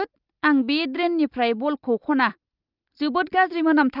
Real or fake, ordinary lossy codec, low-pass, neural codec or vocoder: real; Opus, 32 kbps; 5.4 kHz; none